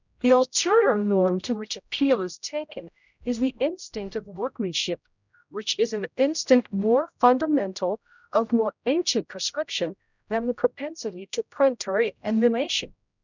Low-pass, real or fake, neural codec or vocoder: 7.2 kHz; fake; codec, 16 kHz, 0.5 kbps, X-Codec, HuBERT features, trained on general audio